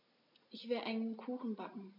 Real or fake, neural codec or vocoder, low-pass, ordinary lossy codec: real; none; 5.4 kHz; MP3, 48 kbps